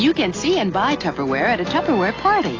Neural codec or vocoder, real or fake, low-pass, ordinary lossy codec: none; real; 7.2 kHz; AAC, 32 kbps